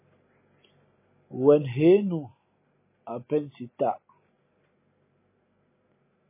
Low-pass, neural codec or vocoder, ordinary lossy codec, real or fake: 3.6 kHz; none; MP3, 16 kbps; real